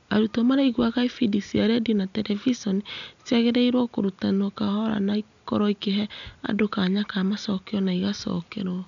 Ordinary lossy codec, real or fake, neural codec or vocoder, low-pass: none; real; none; 7.2 kHz